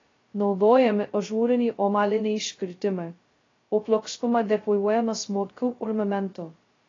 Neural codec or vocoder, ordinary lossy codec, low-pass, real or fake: codec, 16 kHz, 0.2 kbps, FocalCodec; AAC, 32 kbps; 7.2 kHz; fake